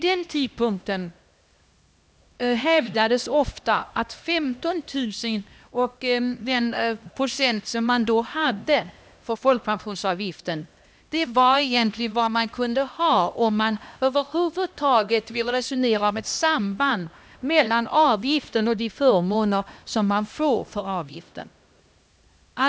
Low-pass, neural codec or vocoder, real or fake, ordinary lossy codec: none; codec, 16 kHz, 1 kbps, X-Codec, HuBERT features, trained on LibriSpeech; fake; none